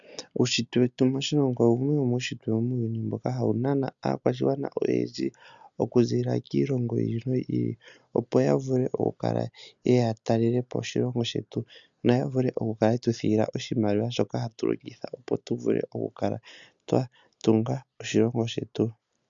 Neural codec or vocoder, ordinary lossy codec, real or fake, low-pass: none; MP3, 96 kbps; real; 7.2 kHz